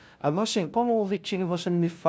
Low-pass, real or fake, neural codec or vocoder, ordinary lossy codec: none; fake; codec, 16 kHz, 0.5 kbps, FunCodec, trained on LibriTTS, 25 frames a second; none